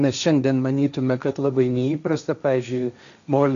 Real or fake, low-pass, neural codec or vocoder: fake; 7.2 kHz; codec, 16 kHz, 1.1 kbps, Voila-Tokenizer